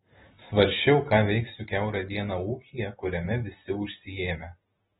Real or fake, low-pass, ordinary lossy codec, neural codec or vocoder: real; 19.8 kHz; AAC, 16 kbps; none